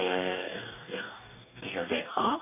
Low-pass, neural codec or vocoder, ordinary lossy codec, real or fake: 3.6 kHz; codec, 44.1 kHz, 2.6 kbps, DAC; none; fake